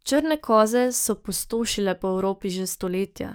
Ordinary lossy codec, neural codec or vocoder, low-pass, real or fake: none; codec, 44.1 kHz, 7.8 kbps, DAC; none; fake